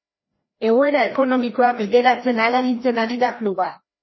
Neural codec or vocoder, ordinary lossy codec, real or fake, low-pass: codec, 16 kHz, 1 kbps, FreqCodec, larger model; MP3, 24 kbps; fake; 7.2 kHz